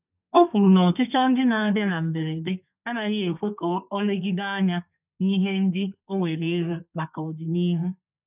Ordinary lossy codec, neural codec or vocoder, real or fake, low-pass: none; codec, 32 kHz, 1.9 kbps, SNAC; fake; 3.6 kHz